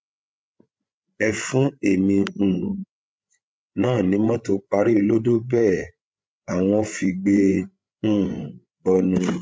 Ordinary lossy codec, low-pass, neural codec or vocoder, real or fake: none; none; codec, 16 kHz, 8 kbps, FreqCodec, larger model; fake